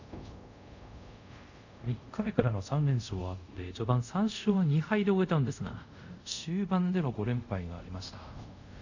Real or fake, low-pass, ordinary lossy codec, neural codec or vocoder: fake; 7.2 kHz; none; codec, 24 kHz, 0.5 kbps, DualCodec